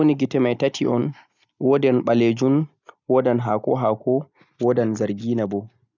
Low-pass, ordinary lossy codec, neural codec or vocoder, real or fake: 7.2 kHz; none; none; real